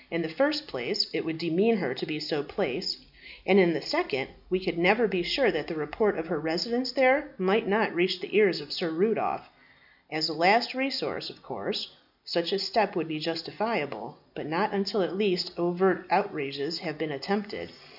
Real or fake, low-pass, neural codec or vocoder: real; 5.4 kHz; none